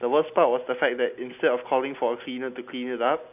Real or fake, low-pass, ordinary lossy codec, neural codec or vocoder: real; 3.6 kHz; none; none